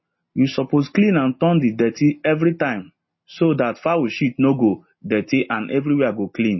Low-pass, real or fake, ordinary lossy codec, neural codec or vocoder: 7.2 kHz; real; MP3, 24 kbps; none